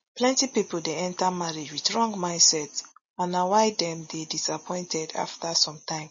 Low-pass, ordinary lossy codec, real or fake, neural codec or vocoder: 7.2 kHz; MP3, 32 kbps; real; none